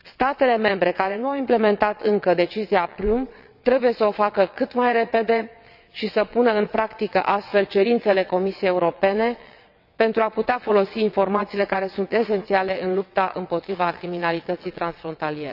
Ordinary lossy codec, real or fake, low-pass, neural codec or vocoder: none; fake; 5.4 kHz; vocoder, 22.05 kHz, 80 mel bands, WaveNeXt